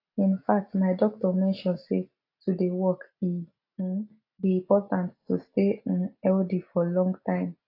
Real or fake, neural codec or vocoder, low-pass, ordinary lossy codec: real; none; 5.4 kHz; AAC, 32 kbps